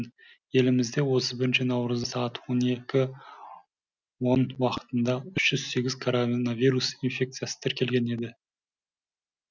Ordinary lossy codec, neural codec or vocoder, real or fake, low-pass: none; none; real; 7.2 kHz